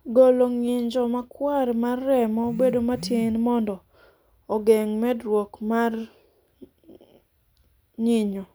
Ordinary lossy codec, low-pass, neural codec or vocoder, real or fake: none; none; none; real